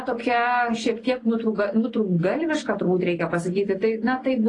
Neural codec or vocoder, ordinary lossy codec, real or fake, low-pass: none; AAC, 32 kbps; real; 10.8 kHz